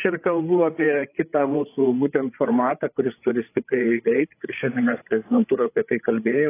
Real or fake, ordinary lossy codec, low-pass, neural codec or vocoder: fake; AAC, 24 kbps; 3.6 kHz; codec, 16 kHz, 8 kbps, FreqCodec, larger model